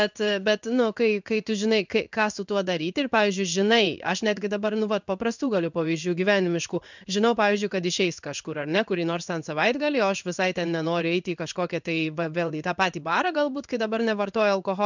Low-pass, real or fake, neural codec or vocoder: 7.2 kHz; fake; codec, 16 kHz in and 24 kHz out, 1 kbps, XY-Tokenizer